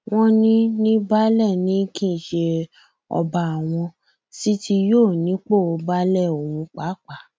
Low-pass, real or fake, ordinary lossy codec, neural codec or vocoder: none; real; none; none